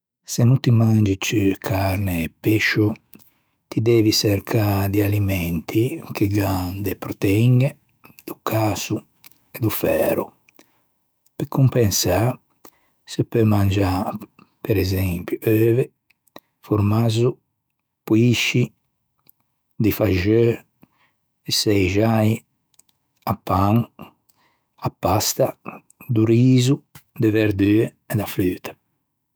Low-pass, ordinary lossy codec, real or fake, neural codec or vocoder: none; none; fake; autoencoder, 48 kHz, 128 numbers a frame, DAC-VAE, trained on Japanese speech